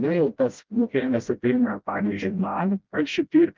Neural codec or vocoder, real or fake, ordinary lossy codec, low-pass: codec, 16 kHz, 0.5 kbps, FreqCodec, smaller model; fake; Opus, 32 kbps; 7.2 kHz